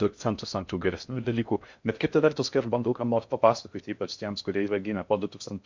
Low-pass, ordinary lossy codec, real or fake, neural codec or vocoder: 7.2 kHz; MP3, 64 kbps; fake; codec, 16 kHz in and 24 kHz out, 0.6 kbps, FocalCodec, streaming, 4096 codes